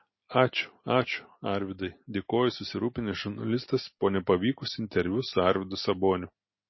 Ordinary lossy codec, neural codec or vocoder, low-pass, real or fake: MP3, 24 kbps; none; 7.2 kHz; real